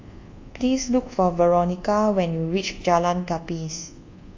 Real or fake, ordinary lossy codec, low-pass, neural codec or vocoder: fake; AAC, 48 kbps; 7.2 kHz; codec, 24 kHz, 1.2 kbps, DualCodec